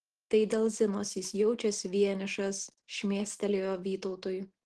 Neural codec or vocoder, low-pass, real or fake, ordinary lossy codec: none; 10.8 kHz; real; Opus, 16 kbps